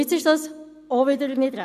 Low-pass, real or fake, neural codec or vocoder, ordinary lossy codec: 14.4 kHz; real; none; none